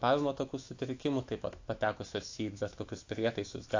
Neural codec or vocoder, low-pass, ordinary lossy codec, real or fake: autoencoder, 48 kHz, 128 numbers a frame, DAC-VAE, trained on Japanese speech; 7.2 kHz; AAC, 48 kbps; fake